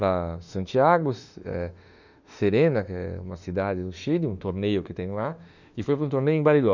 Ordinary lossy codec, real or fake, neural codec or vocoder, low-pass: none; fake; autoencoder, 48 kHz, 32 numbers a frame, DAC-VAE, trained on Japanese speech; 7.2 kHz